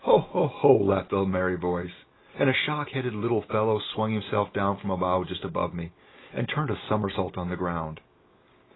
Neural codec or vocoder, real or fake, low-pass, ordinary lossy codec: none; real; 7.2 kHz; AAC, 16 kbps